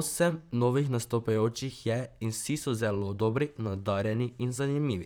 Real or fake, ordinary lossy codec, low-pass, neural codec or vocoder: fake; none; none; vocoder, 44.1 kHz, 128 mel bands, Pupu-Vocoder